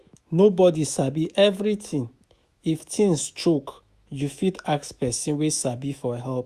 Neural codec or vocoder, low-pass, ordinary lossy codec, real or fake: autoencoder, 48 kHz, 128 numbers a frame, DAC-VAE, trained on Japanese speech; 14.4 kHz; Opus, 64 kbps; fake